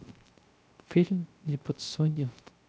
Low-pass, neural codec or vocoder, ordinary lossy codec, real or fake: none; codec, 16 kHz, 0.3 kbps, FocalCodec; none; fake